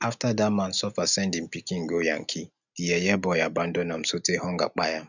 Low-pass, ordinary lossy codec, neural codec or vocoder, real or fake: 7.2 kHz; none; none; real